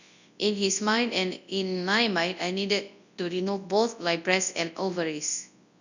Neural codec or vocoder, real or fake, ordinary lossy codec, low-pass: codec, 24 kHz, 0.9 kbps, WavTokenizer, large speech release; fake; none; 7.2 kHz